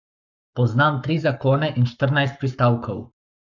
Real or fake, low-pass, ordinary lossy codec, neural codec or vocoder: fake; 7.2 kHz; none; codec, 44.1 kHz, 7.8 kbps, Pupu-Codec